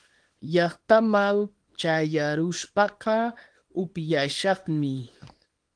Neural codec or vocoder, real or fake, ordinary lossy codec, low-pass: codec, 24 kHz, 0.9 kbps, WavTokenizer, small release; fake; Opus, 24 kbps; 9.9 kHz